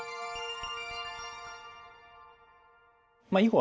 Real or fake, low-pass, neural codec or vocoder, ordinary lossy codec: real; none; none; none